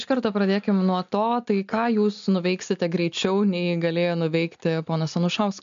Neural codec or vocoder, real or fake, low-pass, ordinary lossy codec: none; real; 7.2 kHz; MP3, 64 kbps